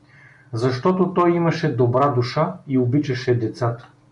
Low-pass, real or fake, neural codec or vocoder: 10.8 kHz; real; none